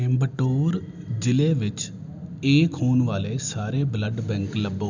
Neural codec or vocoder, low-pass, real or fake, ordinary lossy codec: none; 7.2 kHz; real; none